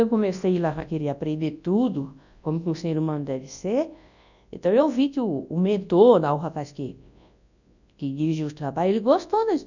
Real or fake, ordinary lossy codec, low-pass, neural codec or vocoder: fake; none; 7.2 kHz; codec, 24 kHz, 0.9 kbps, WavTokenizer, large speech release